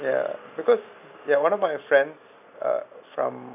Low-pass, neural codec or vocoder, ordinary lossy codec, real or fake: 3.6 kHz; none; none; real